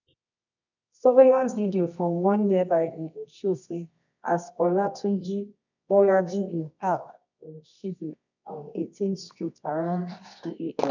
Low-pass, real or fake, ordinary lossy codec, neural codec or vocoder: 7.2 kHz; fake; none; codec, 24 kHz, 0.9 kbps, WavTokenizer, medium music audio release